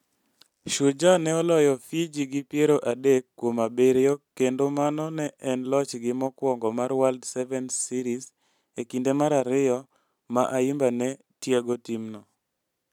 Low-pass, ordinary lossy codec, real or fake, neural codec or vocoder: 19.8 kHz; none; real; none